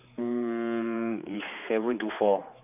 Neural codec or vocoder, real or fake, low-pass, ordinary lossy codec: codec, 16 kHz, 4 kbps, X-Codec, HuBERT features, trained on general audio; fake; 3.6 kHz; none